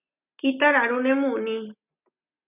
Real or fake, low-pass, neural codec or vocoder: real; 3.6 kHz; none